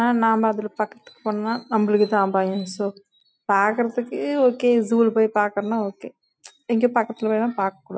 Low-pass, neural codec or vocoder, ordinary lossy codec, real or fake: none; none; none; real